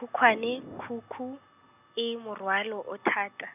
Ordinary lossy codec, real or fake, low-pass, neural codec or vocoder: none; real; 3.6 kHz; none